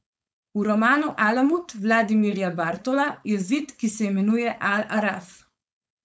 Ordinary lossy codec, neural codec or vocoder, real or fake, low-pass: none; codec, 16 kHz, 4.8 kbps, FACodec; fake; none